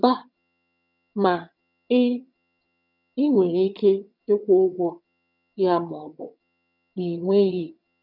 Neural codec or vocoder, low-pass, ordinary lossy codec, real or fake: vocoder, 22.05 kHz, 80 mel bands, HiFi-GAN; 5.4 kHz; none; fake